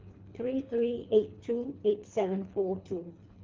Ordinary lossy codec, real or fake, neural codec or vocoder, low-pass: Opus, 32 kbps; fake; codec, 24 kHz, 3 kbps, HILCodec; 7.2 kHz